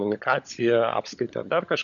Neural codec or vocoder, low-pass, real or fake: codec, 16 kHz, 16 kbps, FunCodec, trained on LibriTTS, 50 frames a second; 7.2 kHz; fake